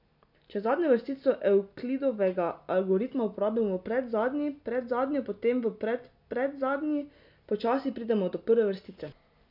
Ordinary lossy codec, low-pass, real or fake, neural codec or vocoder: none; 5.4 kHz; real; none